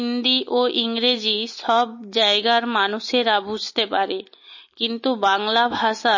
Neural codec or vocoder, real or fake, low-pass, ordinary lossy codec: none; real; 7.2 kHz; MP3, 32 kbps